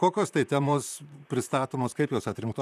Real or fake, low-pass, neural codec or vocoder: fake; 14.4 kHz; vocoder, 44.1 kHz, 128 mel bands, Pupu-Vocoder